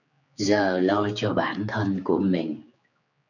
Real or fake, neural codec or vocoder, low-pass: fake; codec, 16 kHz, 4 kbps, X-Codec, HuBERT features, trained on general audio; 7.2 kHz